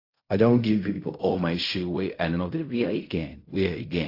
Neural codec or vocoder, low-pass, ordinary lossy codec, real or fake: codec, 16 kHz in and 24 kHz out, 0.4 kbps, LongCat-Audio-Codec, fine tuned four codebook decoder; 5.4 kHz; AAC, 32 kbps; fake